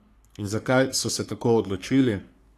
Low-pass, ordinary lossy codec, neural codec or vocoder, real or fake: 14.4 kHz; AAC, 64 kbps; codec, 44.1 kHz, 3.4 kbps, Pupu-Codec; fake